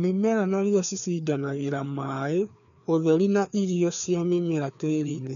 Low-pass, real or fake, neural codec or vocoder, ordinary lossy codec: 7.2 kHz; fake; codec, 16 kHz, 2 kbps, FreqCodec, larger model; none